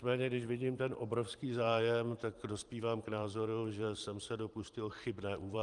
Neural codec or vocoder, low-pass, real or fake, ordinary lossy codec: vocoder, 44.1 kHz, 128 mel bands every 512 samples, BigVGAN v2; 10.8 kHz; fake; Opus, 32 kbps